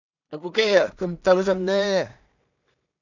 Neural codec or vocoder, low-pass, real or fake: codec, 16 kHz in and 24 kHz out, 0.4 kbps, LongCat-Audio-Codec, two codebook decoder; 7.2 kHz; fake